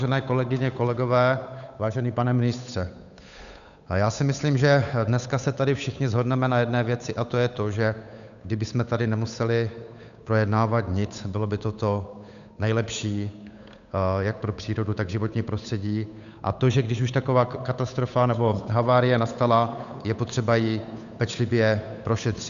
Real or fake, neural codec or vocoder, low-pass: fake; codec, 16 kHz, 8 kbps, FunCodec, trained on Chinese and English, 25 frames a second; 7.2 kHz